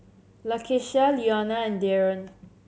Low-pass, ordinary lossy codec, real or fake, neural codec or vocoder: none; none; real; none